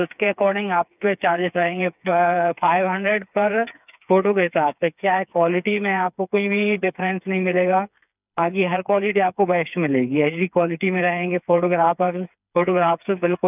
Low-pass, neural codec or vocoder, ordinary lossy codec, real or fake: 3.6 kHz; codec, 16 kHz, 4 kbps, FreqCodec, smaller model; none; fake